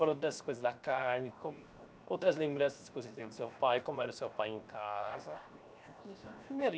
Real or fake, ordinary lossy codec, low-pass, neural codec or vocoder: fake; none; none; codec, 16 kHz, 0.7 kbps, FocalCodec